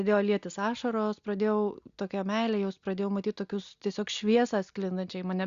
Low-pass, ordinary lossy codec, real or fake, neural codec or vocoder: 7.2 kHz; Opus, 64 kbps; real; none